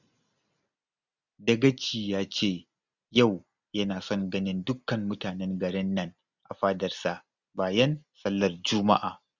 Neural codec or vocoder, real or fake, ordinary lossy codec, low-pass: none; real; none; 7.2 kHz